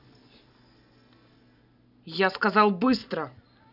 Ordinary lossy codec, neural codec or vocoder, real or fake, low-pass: none; none; real; 5.4 kHz